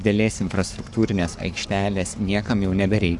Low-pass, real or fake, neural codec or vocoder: 10.8 kHz; fake; codec, 44.1 kHz, 7.8 kbps, Pupu-Codec